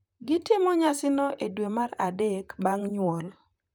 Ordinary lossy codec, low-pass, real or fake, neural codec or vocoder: none; 19.8 kHz; fake; vocoder, 44.1 kHz, 128 mel bands, Pupu-Vocoder